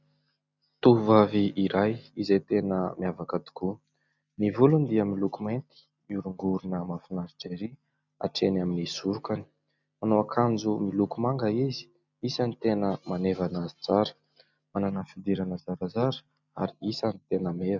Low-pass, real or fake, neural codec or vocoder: 7.2 kHz; real; none